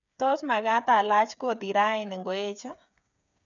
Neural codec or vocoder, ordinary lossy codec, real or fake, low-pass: codec, 16 kHz, 8 kbps, FreqCodec, smaller model; none; fake; 7.2 kHz